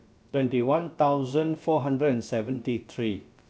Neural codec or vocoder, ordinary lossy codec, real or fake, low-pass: codec, 16 kHz, about 1 kbps, DyCAST, with the encoder's durations; none; fake; none